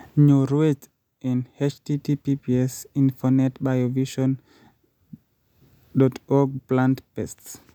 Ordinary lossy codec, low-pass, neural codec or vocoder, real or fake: none; 19.8 kHz; none; real